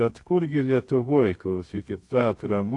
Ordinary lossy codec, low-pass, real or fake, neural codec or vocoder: AAC, 48 kbps; 10.8 kHz; fake; codec, 24 kHz, 0.9 kbps, WavTokenizer, medium music audio release